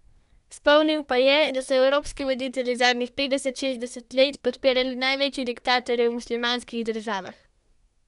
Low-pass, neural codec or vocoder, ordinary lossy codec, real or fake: 10.8 kHz; codec, 24 kHz, 1 kbps, SNAC; none; fake